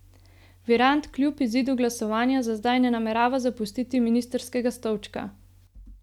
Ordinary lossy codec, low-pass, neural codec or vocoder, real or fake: none; 19.8 kHz; none; real